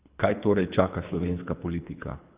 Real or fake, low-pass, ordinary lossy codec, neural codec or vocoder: fake; 3.6 kHz; Opus, 64 kbps; codec, 24 kHz, 3 kbps, HILCodec